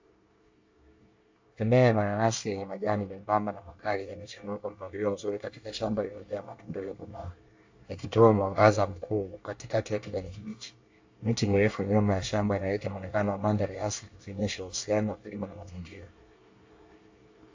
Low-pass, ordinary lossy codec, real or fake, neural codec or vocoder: 7.2 kHz; AAC, 48 kbps; fake; codec, 24 kHz, 1 kbps, SNAC